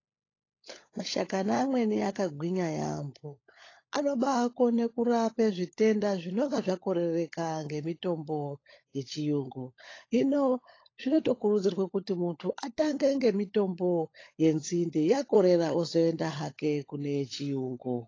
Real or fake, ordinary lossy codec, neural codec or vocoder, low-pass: fake; AAC, 32 kbps; codec, 16 kHz, 16 kbps, FunCodec, trained on LibriTTS, 50 frames a second; 7.2 kHz